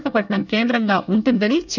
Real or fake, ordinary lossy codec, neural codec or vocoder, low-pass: fake; none; codec, 24 kHz, 1 kbps, SNAC; 7.2 kHz